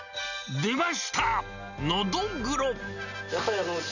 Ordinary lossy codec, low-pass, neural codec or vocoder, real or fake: none; 7.2 kHz; none; real